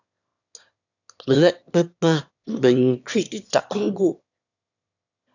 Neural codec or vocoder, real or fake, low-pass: autoencoder, 22.05 kHz, a latent of 192 numbers a frame, VITS, trained on one speaker; fake; 7.2 kHz